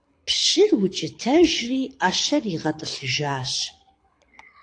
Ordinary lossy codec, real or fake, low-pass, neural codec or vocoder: AAC, 48 kbps; fake; 9.9 kHz; codec, 24 kHz, 6 kbps, HILCodec